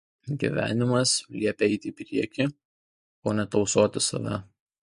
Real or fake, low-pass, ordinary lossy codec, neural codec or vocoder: real; 14.4 kHz; MP3, 48 kbps; none